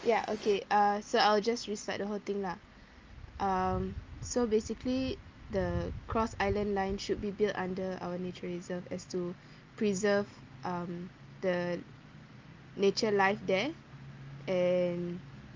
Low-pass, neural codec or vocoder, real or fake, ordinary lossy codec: 7.2 kHz; none; real; Opus, 24 kbps